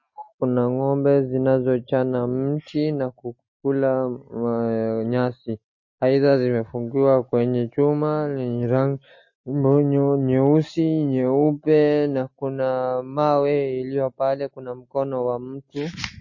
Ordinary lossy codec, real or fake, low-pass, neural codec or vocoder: MP3, 32 kbps; real; 7.2 kHz; none